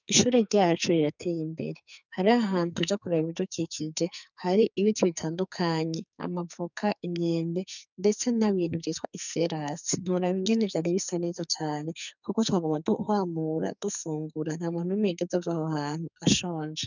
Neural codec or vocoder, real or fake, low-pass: codec, 44.1 kHz, 2.6 kbps, SNAC; fake; 7.2 kHz